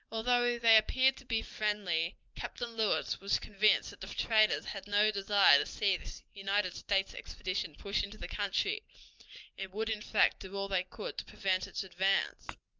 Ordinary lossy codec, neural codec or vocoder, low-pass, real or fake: Opus, 24 kbps; none; 7.2 kHz; real